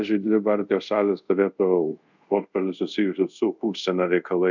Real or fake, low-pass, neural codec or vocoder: fake; 7.2 kHz; codec, 24 kHz, 0.5 kbps, DualCodec